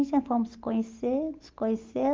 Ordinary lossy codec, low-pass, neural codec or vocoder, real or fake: Opus, 32 kbps; 7.2 kHz; none; real